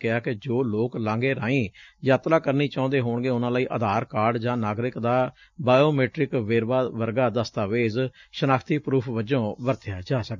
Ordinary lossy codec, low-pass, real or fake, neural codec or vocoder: none; 7.2 kHz; real; none